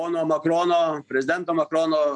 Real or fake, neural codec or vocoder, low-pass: real; none; 10.8 kHz